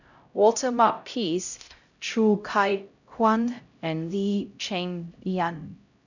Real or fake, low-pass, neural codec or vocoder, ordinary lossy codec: fake; 7.2 kHz; codec, 16 kHz, 0.5 kbps, X-Codec, HuBERT features, trained on LibriSpeech; none